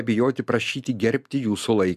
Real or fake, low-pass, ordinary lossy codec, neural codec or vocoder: real; 14.4 kHz; MP3, 96 kbps; none